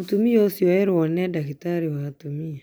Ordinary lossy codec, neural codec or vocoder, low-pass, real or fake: none; none; none; real